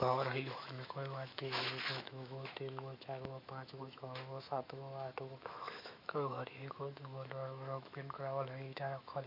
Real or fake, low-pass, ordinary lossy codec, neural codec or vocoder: fake; 5.4 kHz; MP3, 32 kbps; autoencoder, 48 kHz, 128 numbers a frame, DAC-VAE, trained on Japanese speech